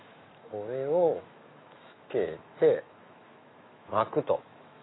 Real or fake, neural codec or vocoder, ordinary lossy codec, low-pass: fake; autoencoder, 48 kHz, 128 numbers a frame, DAC-VAE, trained on Japanese speech; AAC, 16 kbps; 7.2 kHz